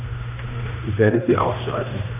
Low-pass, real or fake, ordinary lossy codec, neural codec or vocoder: 3.6 kHz; fake; none; codec, 16 kHz, 1 kbps, X-Codec, HuBERT features, trained on general audio